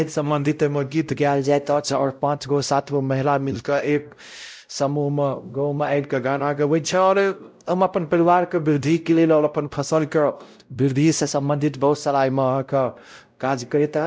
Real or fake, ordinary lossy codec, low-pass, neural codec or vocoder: fake; none; none; codec, 16 kHz, 0.5 kbps, X-Codec, WavLM features, trained on Multilingual LibriSpeech